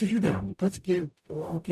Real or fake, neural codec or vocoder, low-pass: fake; codec, 44.1 kHz, 0.9 kbps, DAC; 14.4 kHz